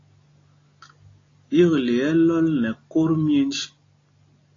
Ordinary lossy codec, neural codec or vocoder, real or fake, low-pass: AAC, 32 kbps; none; real; 7.2 kHz